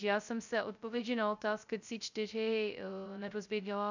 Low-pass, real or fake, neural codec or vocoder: 7.2 kHz; fake; codec, 16 kHz, 0.2 kbps, FocalCodec